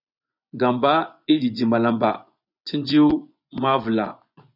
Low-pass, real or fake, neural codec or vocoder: 5.4 kHz; fake; vocoder, 24 kHz, 100 mel bands, Vocos